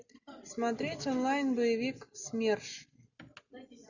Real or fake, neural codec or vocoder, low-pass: real; none; 7.2 kHz